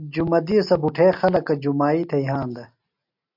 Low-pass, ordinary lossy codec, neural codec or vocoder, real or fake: 5.4 kHz; MP3, 48 kbps; none; real